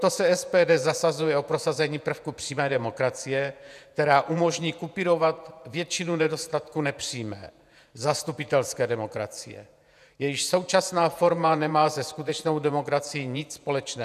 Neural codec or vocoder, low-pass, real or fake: vocoder, 48 kHz, 128 mel bands, Vocos; 14.4 kHz; fake